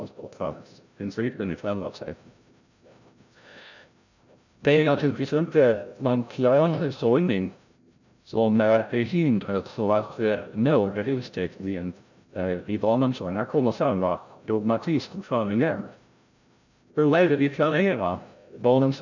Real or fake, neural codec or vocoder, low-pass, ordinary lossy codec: fake; codec, 16 kHz, 0.5 kbps, FreqCodec, larger model; 7.2 kHz; none